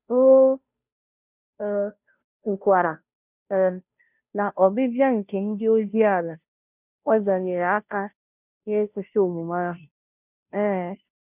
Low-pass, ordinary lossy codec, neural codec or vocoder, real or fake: 3.6 kHz; none; codec, 16 kHz, 0.5 kbps, FunCodec, trained on Chinese and English, 25 frames a second; fake